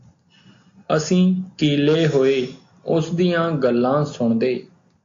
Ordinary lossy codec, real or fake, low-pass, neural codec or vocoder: AAC, 48 kbps; real; 7.2 kHz; none